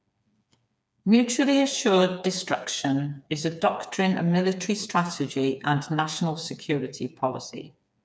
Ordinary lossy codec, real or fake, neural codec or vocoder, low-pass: none; fake; codec, 16 kHz, 4 kbps, FreqCodec, smaller model; none